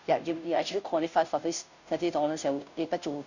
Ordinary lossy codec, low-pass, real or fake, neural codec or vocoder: none; 7.2 kHz; fake; codec, 16 kHz, 0.5 kbps, FunCodec, trained on Chinese and English, 25 frames a second